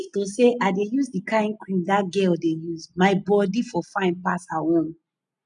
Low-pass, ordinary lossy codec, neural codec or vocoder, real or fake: 9.9 kHz; none; none; real